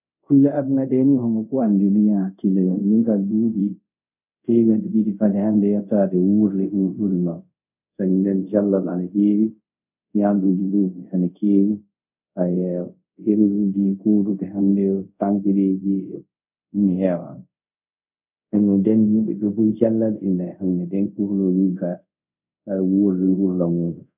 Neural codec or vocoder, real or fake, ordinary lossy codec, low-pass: codec, 24 kHz, 0.5 kbps, DualCodec; fake; none; 3.6 kHz